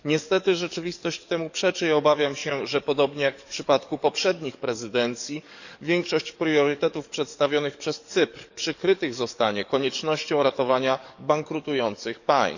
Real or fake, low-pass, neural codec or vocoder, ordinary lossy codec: fake; 7.2 kHz; codec, 44.1 kHz, 7.8 kbps, DAC; none